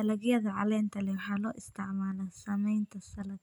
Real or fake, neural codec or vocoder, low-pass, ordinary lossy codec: real; none; 19.8 kHz; none